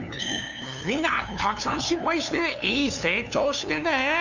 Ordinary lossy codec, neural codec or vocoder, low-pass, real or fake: none; codec, 16 kHz, 2 kbps, FunCodec, trained on LibriTTS, 25 frames a second; 7.2 kHz; fake